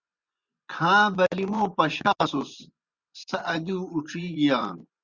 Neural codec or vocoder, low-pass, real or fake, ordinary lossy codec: vocoder, 24 kHz, 100 mel bands, Vocos; 7.2 kHz; fake; Opus, 64 kbps